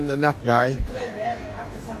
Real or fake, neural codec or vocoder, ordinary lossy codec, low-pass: fake; codec, 44.1 kHz, 2.6 kbps, DAC; AAC, 64 kbps; 14.4 kHz